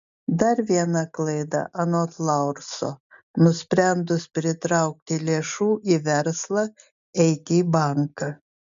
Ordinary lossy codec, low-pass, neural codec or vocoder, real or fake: MP3, 64 kbps; 7.2 kHz; none; real